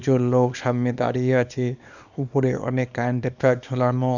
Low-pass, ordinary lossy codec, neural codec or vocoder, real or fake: 7.2 kHz; none; codec, 24 kHz, 0.9 kbps, WavTokenizer, small release; fake